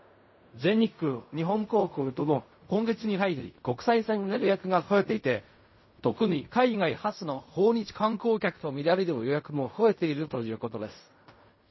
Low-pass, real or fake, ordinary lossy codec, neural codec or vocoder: 7.2 kHz; fake; MP3, 24 kbps; codec, 16 kHz in and 24 kHz out, 0.4 kbps, LongCat-Audio-Codec, fine tuned four codebook decoder